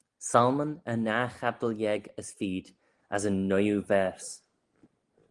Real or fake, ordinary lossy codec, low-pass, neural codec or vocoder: real; Opus, 24 kbps; 10.8 kHz; none